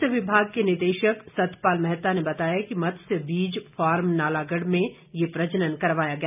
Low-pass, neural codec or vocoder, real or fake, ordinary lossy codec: 3.6 kHz; none; real; none